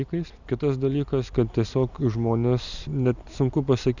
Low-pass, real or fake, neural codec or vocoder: 7.2 kHz; real; none